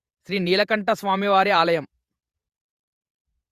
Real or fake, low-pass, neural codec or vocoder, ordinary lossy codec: fake; 14.4 kHz; vocoder, 48 kHz, 128 mel bands, Vocos; Opus, 64 kbps